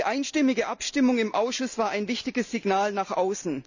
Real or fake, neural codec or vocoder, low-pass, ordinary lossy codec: real; none; 7.2 kHz; none